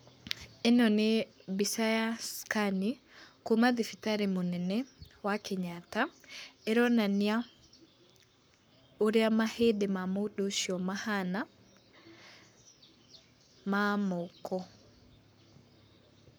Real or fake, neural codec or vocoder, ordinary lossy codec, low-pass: fake; codec, 44.1 kHz, 7.8 kbps, Pupu-Codec; none; none